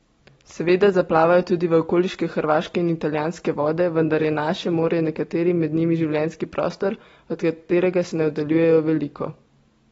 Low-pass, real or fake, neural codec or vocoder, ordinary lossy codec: 10.8 kHz; real; none; AAC, 24 kbps